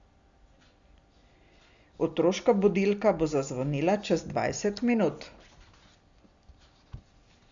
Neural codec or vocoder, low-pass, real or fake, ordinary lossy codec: none; 7.2 kHz; real; Opus, 64 kbps